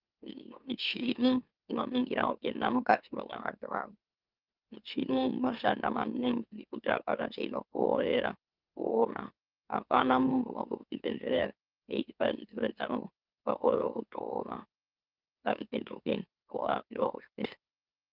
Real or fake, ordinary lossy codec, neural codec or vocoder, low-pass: fake; Opus, 24 kbps; autoencoder, 44.1 kHz, a latent of 192 numbers a frame, MeloTTS; 5.4 kHz